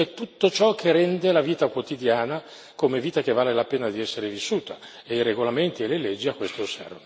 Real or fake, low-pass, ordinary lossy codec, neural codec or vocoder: real; none; none; none